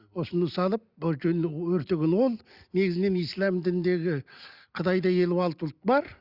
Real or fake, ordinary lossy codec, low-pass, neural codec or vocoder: real; Opus, 64 kbps; 5.4 kHz; none